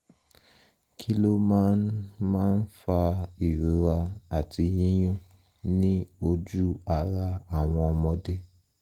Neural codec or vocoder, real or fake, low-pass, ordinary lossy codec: none; real; 19.8 kHz; Opus, 24 kbps